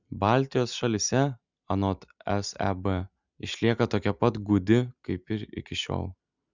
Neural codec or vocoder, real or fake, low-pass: none; real; 7.2 kHz